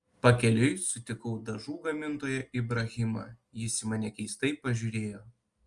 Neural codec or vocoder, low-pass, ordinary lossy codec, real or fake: none; 10.8 kHz; Opus, 32 kbps; real